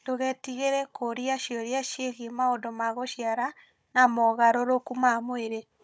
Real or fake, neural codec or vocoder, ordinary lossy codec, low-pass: fake; codec, 16 kHz, 16 kbps, FunCodec, trained on Chinese and English, 50 frames a second; none; none